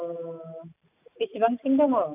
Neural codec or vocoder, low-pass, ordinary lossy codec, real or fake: none; 3.6 kHz; none; real